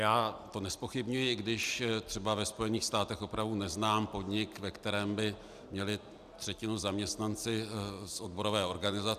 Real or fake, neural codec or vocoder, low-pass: real; none; 14.4 kHz